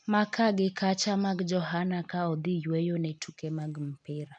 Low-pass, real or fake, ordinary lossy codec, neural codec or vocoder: 9.9 kHz; real; none; none